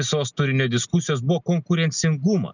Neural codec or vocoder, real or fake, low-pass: none; real; 7.2 kHz